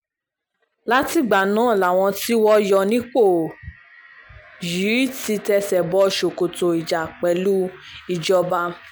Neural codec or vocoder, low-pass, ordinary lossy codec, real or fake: none; none; none; real